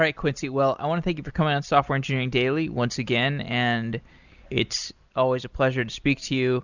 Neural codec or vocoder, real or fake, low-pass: none; real; 7.2 kHz